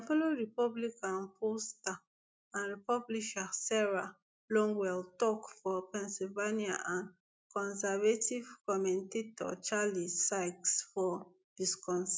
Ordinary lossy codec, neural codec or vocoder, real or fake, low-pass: none; none; real; none